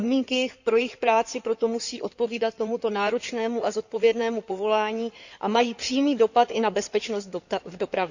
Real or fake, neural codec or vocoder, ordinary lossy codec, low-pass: fake; codec, 16 kHz in and 24 kHz out, 2.2 kbps, FireRedTTS-2 codec; none; 7.2 kHz